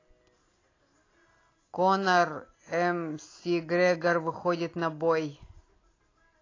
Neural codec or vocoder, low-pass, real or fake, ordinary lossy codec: none; 7.2 kHz; real; AAC, 32 kbps